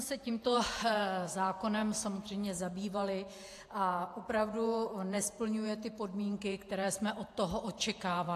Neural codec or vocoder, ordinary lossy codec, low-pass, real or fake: vocoder, 48 kHz, 128 mel bands, Vocos; AAC, 64 kbps; 14.4 kHz; fake